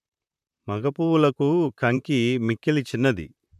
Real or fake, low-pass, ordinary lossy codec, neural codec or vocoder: fake; 14.4 kHz; none; vocoder, 44.1 kHz, 128 mel bands, Pupu-Vocoder